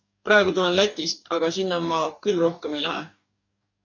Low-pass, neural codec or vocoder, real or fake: 7.2 kHz; codec, 44.1 kHz, 2.6 kbps, DAC; fake